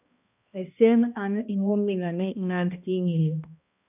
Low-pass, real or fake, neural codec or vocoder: 3.6 kHz; fake; codec, 16 kHz, 1 kbps, X-Codec, HuBERT features, trained on balanced general audio